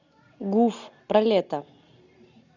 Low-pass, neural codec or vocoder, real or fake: 7.2 kHz; none; real